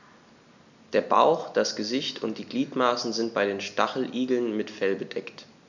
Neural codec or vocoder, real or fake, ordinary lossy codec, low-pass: none; real; none; 7.2 kHz